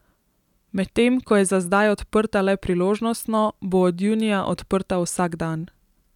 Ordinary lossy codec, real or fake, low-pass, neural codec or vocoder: none; real; 19.8 kHz; none